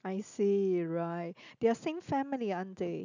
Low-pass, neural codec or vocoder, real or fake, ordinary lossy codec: 7.2 kHz; none; real; none